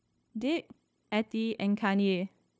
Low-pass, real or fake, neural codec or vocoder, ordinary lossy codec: none; fake; codec, 16 kHz, 0.9 kbps, LongCat-Audio-Codec; none